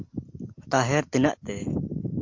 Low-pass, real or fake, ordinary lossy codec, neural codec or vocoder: 7.2 kHz; real; MP3, 48 kbps; none